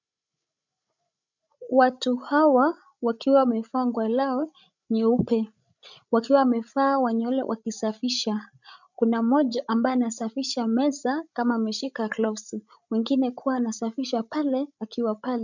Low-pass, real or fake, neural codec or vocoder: 7.2 kHz; fake; codec, 16 kHz, 16 kbps, FreqCodec, larger model